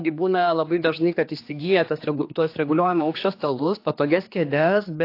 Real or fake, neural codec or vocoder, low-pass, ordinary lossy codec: fake; codec, 16 kHz, 2 kbps, X-Codec, HuBERT features, trained on general audio; 5.4 kHz; AAC, 32 kbps